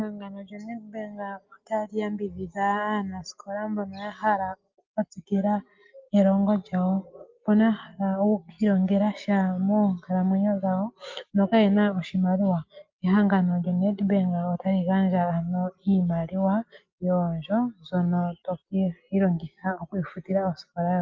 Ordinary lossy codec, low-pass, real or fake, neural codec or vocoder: Opus, 32 kbps; 7.2 kHz; real; none